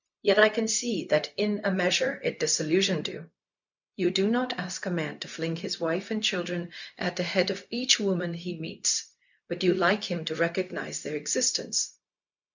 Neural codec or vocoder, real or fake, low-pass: codec, 16 kHz, 0.4 kbps, LongCat-Audio-Codec; fake; 7.2 kHz